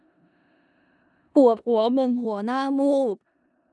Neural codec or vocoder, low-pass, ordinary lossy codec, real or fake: codec, 16 kHz in and 24 kHz out, 0.4 kbps, LongCat-Audio-Codec, four codebook decoder; 10.8 kHz; none; fake